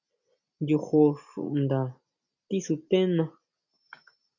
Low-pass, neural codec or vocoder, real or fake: 7.2 kHz; none; real